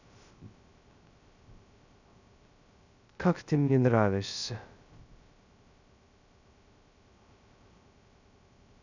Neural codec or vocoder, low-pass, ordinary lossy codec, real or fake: codec, 16 kHz, 0.2 kbps, FocalCodec; 7.2 kHz; none; fake